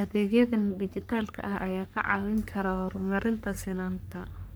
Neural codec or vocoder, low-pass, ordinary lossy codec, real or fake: codec, 44.1 kHz, 3.4 kbps, Pupu-Codec; none; none; fake